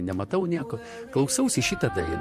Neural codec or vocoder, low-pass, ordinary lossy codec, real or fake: vocoder, 44.1 kHz, 128 mel bands, Pupu-Vocoder; 14.4 kHz; MP3, 64 kbps; fake